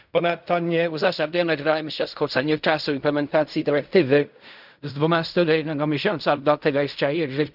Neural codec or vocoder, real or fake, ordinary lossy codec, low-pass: codec, 16 kHz in and 24 kHz out, 0.4 kbps, LongCat-Audio-Codec, fine tuned four codebook decoder; fake; none; 5.4 kHz